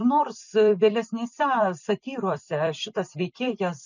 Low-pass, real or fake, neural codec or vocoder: 7.2 kHz; fake; vocoder, 44.1 kHz, 128 mel bands every 256 samples, BigVGAN v2